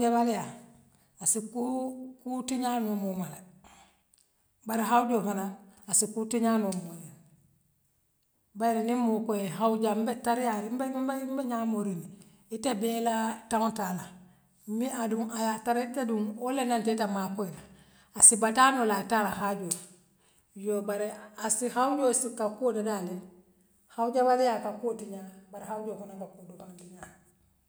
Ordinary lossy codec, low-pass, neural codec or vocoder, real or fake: none; none; none; real